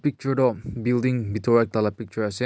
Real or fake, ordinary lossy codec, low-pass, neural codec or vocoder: real; none; none; none